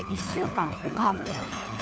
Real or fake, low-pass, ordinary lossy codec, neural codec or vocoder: fake; none; none; codec, 16 kHz, 4 kbps, FunCodec, trained on LibriTTS, 50 frames a second